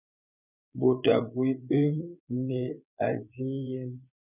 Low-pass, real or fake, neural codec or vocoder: 3.6 kHz; fake; vocoder, 22.05 kHz, 80 mel bands, Vocos